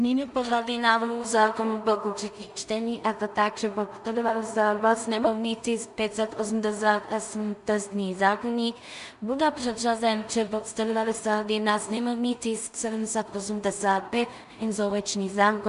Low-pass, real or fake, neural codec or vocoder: 10.8 kHz; fake; codec, 16 kHz in and 24 kHz out, 0.4 kbps, LongCat-Audio-Codec, two codebook decoder